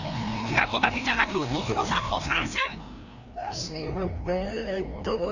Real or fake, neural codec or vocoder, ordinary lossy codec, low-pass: fake; codec, 16 kHz, 1 kbps, FreqCodec, larger model; none; 7.2 kHz